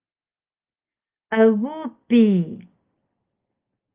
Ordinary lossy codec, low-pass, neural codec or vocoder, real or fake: Opus, 32 kbps; 3.6 kHz; none; real